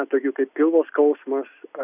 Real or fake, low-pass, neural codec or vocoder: real; 3.6 kHz; none